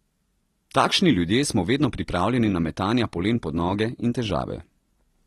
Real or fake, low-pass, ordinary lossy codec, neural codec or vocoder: real; 19.8 kHz; AAC, 32 kbps; none